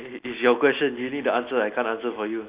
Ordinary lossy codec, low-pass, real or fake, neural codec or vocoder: Opus, 64 kbps; 3.6 kHz; real; none